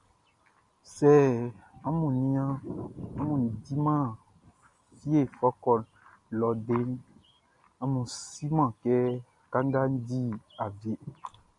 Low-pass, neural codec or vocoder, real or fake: 10.8 kHz; none; real